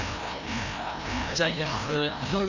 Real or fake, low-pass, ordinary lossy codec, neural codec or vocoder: fake; 7.2 kHz; none; codec, 16 kHz, 1 kbps, FreqCodec, larger model